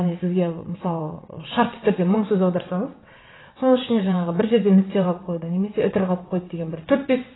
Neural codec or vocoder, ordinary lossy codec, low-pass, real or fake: vocoder, 22.05 kHz, 80 mel bands, WaveNeXt; AAC, 16 kbps; 7.2 kHz; fake